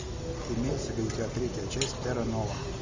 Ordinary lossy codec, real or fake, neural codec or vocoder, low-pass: MP3, 64 kbps; real; none; 7.2 kHz